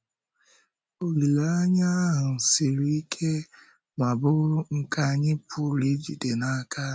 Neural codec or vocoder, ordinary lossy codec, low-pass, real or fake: none; none; none; real